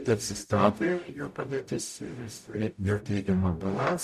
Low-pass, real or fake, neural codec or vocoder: 14.4 kHz; fake; codec, 44.1 kHz, 0.9 kbps, DAC